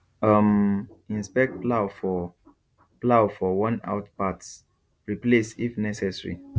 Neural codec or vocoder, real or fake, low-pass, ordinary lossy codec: none; real; none; none